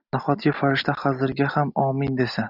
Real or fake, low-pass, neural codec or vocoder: real; 5.4 kHz; none